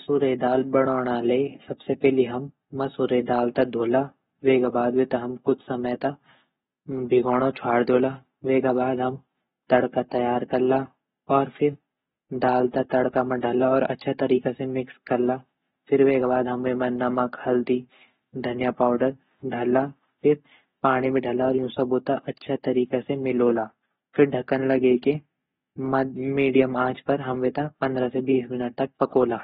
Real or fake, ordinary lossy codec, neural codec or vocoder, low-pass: real; AAC, 16 kbps; none; 7.2 kHz